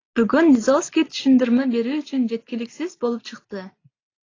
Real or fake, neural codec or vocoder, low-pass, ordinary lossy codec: real; none; 7.2 kHz; AAC, 32 kbps